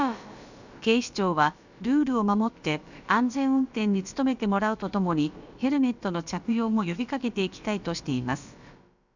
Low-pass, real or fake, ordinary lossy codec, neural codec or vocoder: 7.2 kHz; fake; none; codec, 16 kHz, about 1 kbps, DyCAST, with the encoder's durations